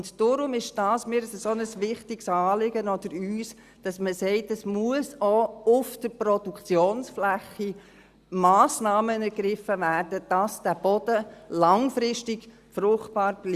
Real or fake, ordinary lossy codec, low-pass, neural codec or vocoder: real; Opus, 64 kbps; 14.4 kHz; none